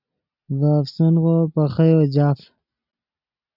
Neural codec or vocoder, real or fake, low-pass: none; real; 5.4 kHz